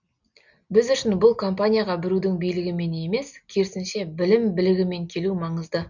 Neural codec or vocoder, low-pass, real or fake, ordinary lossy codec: none; 7.2 kHz; real; none